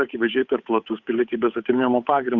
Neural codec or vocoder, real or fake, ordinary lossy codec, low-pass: codec, 16 kHz, 8 kbps, FunCodec, trained on Chinese and English, 25 frames a second; fake; Opus, 64 kbps; 7.2 kHz